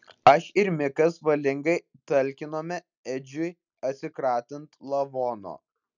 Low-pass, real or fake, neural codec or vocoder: 7.2 kHz; real; none